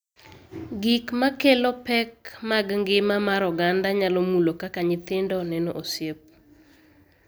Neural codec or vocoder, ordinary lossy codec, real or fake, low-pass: none; none; real; none